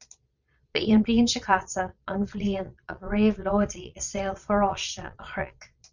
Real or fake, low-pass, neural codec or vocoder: fake; 7.2 kHz; vocoder, 22.05 kHz, 80 mel bands, Vocos